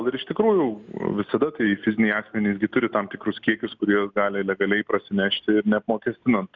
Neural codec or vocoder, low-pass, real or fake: none; 7.2 kHz; real